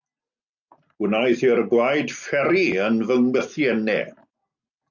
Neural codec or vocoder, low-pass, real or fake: none; 7.2 kHz; real